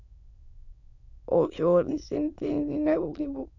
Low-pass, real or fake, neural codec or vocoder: 7.2 kHz; fake; autoencoder, 22.05 kHz, a latent of 192 numbers a frame, VITS, trained on many speakers